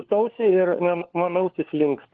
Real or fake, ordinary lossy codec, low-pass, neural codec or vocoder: fake; Opus, 16 kbps; 7.2 kHz; codec, 16 kHz, 4 kbps, FunCodec, trained on LibriTTS, 50 frames a second